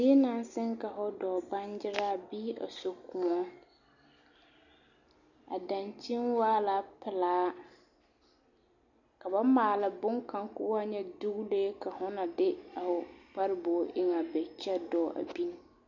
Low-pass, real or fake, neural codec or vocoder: 7.2 kHz; real; none